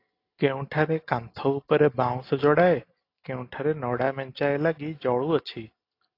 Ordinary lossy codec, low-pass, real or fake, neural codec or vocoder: AAC, 32 kbps; 5.4 kHz; real; none